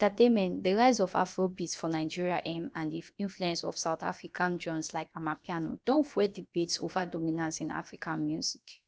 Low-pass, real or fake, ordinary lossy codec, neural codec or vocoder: none; fake; none; codec, 16 kHz, about 1 kbps, DyCAST, with the encoder's durations